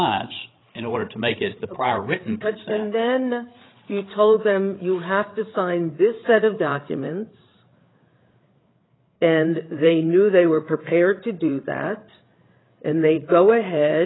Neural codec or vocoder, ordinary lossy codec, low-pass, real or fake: codec, 16 kHz, 8 kbps, FreqCodec, larger model; AAC, 16 kbps; 7.2 kHz; fake